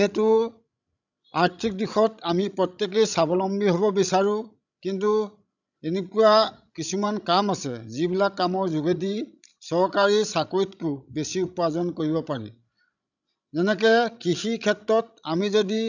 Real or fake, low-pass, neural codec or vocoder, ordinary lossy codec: fake; 7.2 kHz; codec, 16 kHz, 16 kbps, FreqCodec, larger model; none